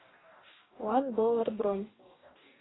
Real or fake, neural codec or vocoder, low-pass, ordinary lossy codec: fake; codec, 44.1 kHz, 2.6 kbps, DAC; 7.2 kHz; AAC, 16 kbps